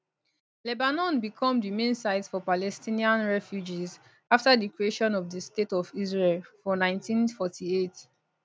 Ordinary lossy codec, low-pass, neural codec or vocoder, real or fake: none; none; none; real